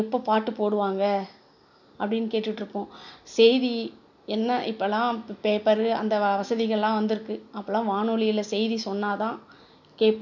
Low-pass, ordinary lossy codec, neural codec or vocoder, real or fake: 7.2 kHz; none; none; real